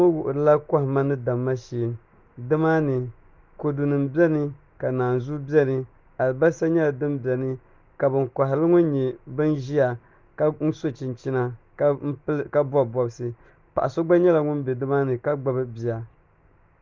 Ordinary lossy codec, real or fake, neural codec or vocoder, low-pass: Opus, 24 kbps; real; none; 7.2 kHz